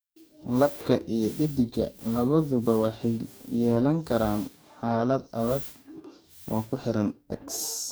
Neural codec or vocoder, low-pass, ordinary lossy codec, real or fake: codec, 44.1 kHz, 2.6 kbps, DAC; none; none; fake